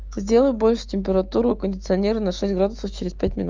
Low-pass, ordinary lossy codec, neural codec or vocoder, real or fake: 7.2 kHz; Opus, 32 kbps; autoencoder, 48 kHz, 128 numbers a frame, DAC-VAE, trained on Japanese speech; fake